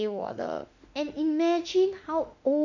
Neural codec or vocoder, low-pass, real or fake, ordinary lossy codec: autoencoder, 48 kHz, 32 numbers a frame, DAC-VAE, trained on Japanese speech; 7.2 kHz; fake; none